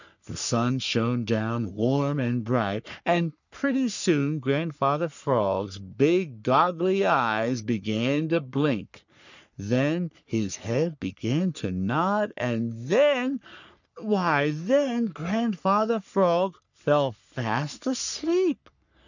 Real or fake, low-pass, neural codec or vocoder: fake; 7.2 kHz; codec, 44.1 kHz, 3.4 kbps, Pupu-Codec